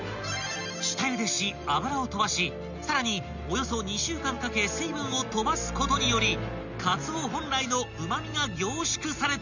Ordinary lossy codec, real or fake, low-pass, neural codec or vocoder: none; real; 7.2 kHz; none